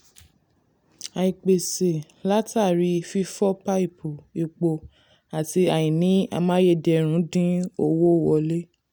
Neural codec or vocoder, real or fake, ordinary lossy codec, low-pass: none; real; none; none